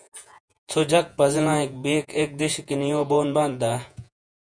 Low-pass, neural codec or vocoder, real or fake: 9.9 kHz; vocoder, 48 kHz, 128 mel bands, Vocos; fake